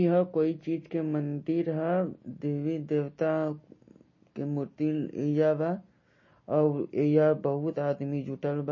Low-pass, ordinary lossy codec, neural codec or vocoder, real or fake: 7.2 kHz; MP3, 32 kbps; none; real